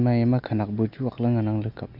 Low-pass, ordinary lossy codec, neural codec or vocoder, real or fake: 5.4 kHz; none; none; real